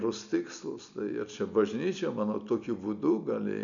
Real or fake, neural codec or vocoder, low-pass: real; none; 7.2 kHz